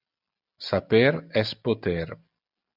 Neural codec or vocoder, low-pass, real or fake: none; 5.4 kHz; real